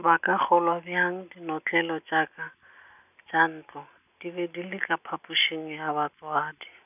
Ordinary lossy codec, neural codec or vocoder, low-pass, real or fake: none; none; 3.6 kHz; real